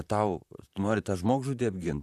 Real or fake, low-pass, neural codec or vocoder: fake; 14.4 kHz; vocoder, 44.1 kHz, 128 mel bands, Pupu-Vocoder